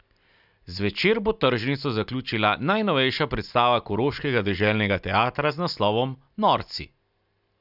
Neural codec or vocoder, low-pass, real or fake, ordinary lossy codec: none; 5.4 kHz; real; none